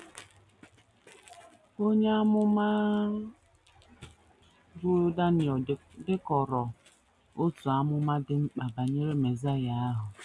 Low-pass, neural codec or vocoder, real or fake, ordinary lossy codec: none; none; real; none